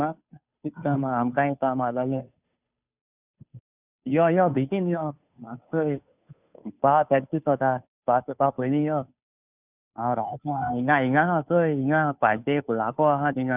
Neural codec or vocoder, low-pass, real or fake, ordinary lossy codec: codec, 16 kHz, 2 kbps, FunCodec, trained on Chinese and English, 25 frames a second; 3.6 kHz; fake; none